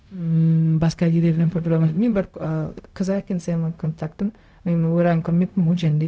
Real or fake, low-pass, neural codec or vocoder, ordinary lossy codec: fake; none; codec, 16 kHz, 0.4 kbps, LongCat-Audio-Codec; none